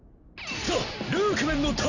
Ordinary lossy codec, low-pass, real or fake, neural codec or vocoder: none; 7.2 kHz; real; none